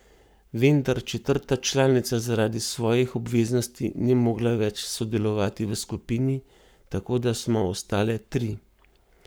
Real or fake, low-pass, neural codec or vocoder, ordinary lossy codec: fake; none; codec, 44.1 kHz, 7.8 kbps, Pupu-Codec; none